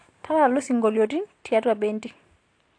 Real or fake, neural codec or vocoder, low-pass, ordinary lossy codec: real; none; 9.9 kHz; AAC, 48 kbps